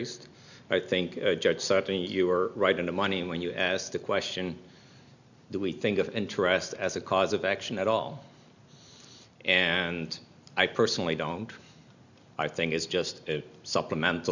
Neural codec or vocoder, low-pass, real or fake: none; 7.2 kHz; real